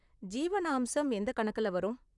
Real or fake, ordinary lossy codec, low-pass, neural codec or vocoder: fake; none; 10.8 kHz; vocoder, 44.1 kHz, 128 mel bands, Pupu-Vocoder